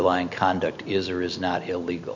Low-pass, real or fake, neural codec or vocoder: 7.2 kHz; real; none